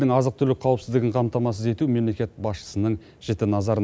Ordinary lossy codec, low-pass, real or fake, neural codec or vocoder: none; none; real; none